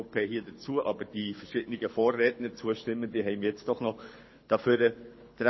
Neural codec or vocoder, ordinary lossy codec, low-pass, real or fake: codec, 24 kHz, 6 kbps, HILCodec; MP3, 24 kbps; 7.2 kHz; fake